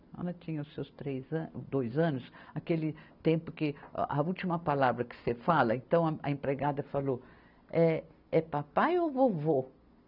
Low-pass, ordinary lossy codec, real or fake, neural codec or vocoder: 5.4 kHz; none; real; none